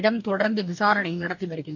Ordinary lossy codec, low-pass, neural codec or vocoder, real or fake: none; 7.2 kHz; codec, 44.1 kHz, 2.6 kbps, DAC; fake